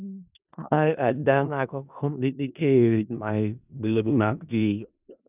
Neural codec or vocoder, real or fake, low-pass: codec, 16 kHz in and 24 kHz out, 0.4 kbps, LongCat-Audio-Codec, four codebook decoder; fake; 3.6 kHz